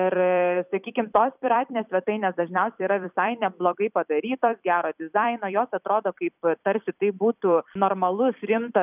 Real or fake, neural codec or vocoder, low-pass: fake; vocoder, 44.1 kHz, 128 mel bands every 256 samples, BigVGAN v2; 3.6 kHz